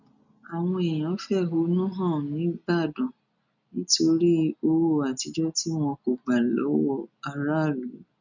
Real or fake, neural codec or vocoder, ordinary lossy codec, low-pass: real; none; none; 7.2 kHz